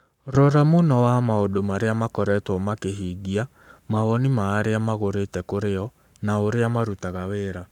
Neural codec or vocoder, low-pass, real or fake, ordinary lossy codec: codec, 44.1 kHz, 7.8 kbps, Pupu-Codec; 19.8 kHz; fake; none